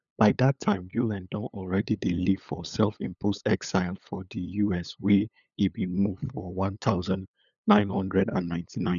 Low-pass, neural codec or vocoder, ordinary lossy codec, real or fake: 7.2 kHz; codec, 16 kHz, 8 kbps, FunCodec, trained on LibriTTS, 25 frames a second; none; fake